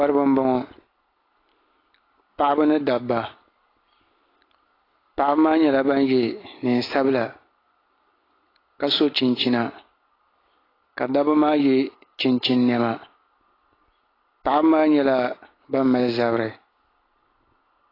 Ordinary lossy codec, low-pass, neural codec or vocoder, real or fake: AAC, 24 kbps; 5.4 kHz; none; real